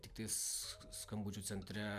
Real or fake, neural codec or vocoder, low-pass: real; none; 14.4 kHz